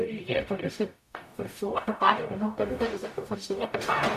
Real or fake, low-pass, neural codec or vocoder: fake; 14.4 kHz; codec, 44.1 kHz, 0.9 kbps, DAC